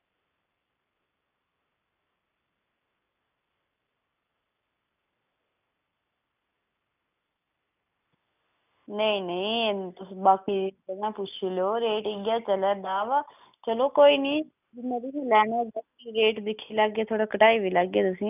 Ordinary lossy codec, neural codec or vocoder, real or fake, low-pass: none; none; real; 3.6 kHz